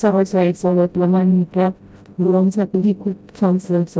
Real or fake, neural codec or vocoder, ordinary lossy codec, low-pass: fake; codec, 16 kHz, 0.5 kbps, FreqCodec, smaller model; none; none